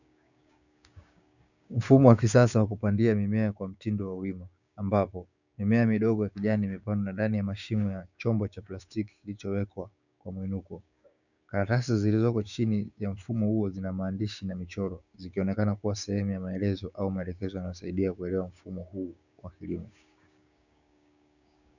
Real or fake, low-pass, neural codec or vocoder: fake; 7.2 kHz; codec, 16 kHz, 6 kbps, DAC